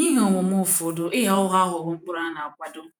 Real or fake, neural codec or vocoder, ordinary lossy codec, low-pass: fake; vocoder, 48 kHz, 128 mel bands, Vocos; none; none